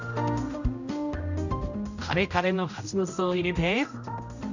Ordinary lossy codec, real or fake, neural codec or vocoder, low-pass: none; fake; codec, 16 kHz, 0.5 kbps, X-Codec, HuBERT features, trained on general audio; 7.2 kHz